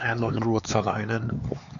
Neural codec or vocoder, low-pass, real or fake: codec, 16 kHz, 4 kbps, X-Codec, HuBERT features, trained on LibriSpeech; 7.2 kHz; fake